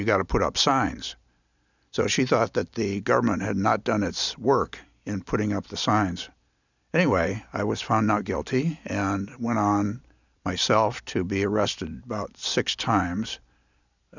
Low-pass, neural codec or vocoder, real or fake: 7.2 kHz; none; real